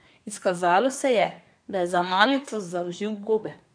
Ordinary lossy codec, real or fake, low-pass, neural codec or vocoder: none; fake; 9.9 kHz; codec, 24 kHz, 1 kbps, SNAC